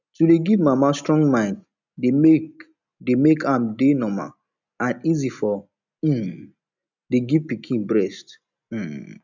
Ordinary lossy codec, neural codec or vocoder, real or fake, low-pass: none; none; real; 7.2 kHz